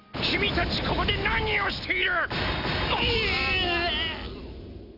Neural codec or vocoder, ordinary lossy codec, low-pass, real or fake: none; none; 5.4 kHz; real